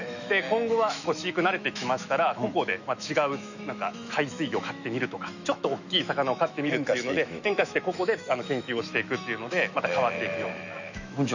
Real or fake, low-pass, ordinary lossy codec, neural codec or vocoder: fake; 7.2 kHz; none; autoencoder, 48 kHz, 128 numbers a frame, DAC-VAE, trained on Japanese speech